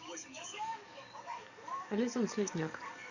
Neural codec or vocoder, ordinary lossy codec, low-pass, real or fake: codec, 44.1 kHz, 7.8 kbps, DAC; none; 7.2 kHz; fake